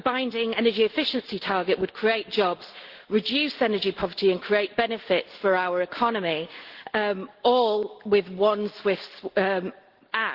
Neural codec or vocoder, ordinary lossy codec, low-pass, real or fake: none; Opus, 16 kbps; 5.4 kHz; real